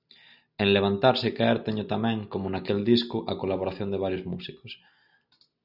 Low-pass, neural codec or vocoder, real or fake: 5.4 kHz; none; real